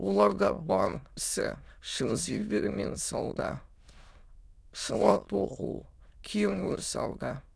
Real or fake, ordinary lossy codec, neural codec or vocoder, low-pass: fake; none; autoencoder, 22.05 kHz, a latent of 192 numbers a frame, VITS, trained on many speakers; none